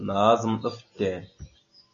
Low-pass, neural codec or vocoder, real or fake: 7.2 kHz; none; real